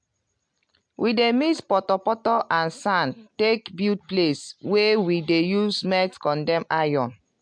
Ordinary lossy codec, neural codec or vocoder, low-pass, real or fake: MP3, 64 kbps; none; 9.9 kHz; real